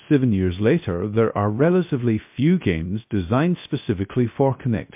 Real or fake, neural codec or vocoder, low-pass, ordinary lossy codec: fake; codec, 16 kHz, 0.3 kbps, FocalCodec; 3.6 kHz; MP3, 32 kbps